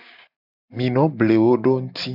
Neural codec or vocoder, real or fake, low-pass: none; real; 5.4 kHz